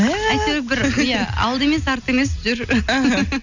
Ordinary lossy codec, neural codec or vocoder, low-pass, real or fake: none; none; 7.2 kHz; real